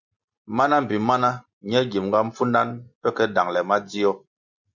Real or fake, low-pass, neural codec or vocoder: real; 7.2 kHz; none